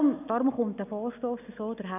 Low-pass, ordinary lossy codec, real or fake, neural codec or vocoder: 3.6 kHz; none; real; none